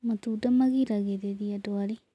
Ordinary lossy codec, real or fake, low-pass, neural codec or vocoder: none; real; none; none